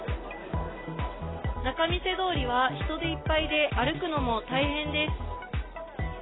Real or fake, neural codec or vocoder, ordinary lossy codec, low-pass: real; none; AAC, 16 kbps; 7.2 kHz